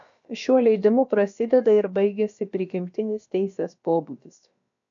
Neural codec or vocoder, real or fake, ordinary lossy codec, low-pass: codec, 16 kHz, about 1 kbps, DyCAST, with the encoder's durations; fake; MP3, 64 kbps; 7.2 kHz